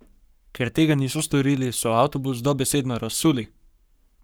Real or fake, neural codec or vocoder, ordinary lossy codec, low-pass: fake; codec, 44.1 kHz, 7.8 kbps, Pupu-Codec; none; none